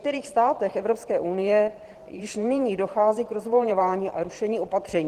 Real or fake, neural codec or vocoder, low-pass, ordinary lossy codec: fake; vocoder, 44.1 kHz, 128 mel bands every 512 samples, BigVGAN v2; 14.4 kHz; Opus, 16 kbps